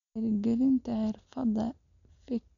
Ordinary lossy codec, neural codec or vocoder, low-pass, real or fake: none; none; 7.2 kHz; real